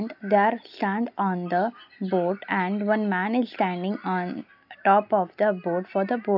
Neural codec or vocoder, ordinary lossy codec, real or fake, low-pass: none; none; real; 5.4 kHz